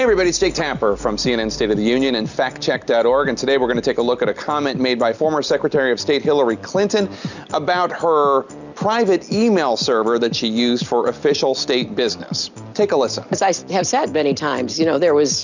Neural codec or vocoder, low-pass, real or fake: none; 7.2 kHz; real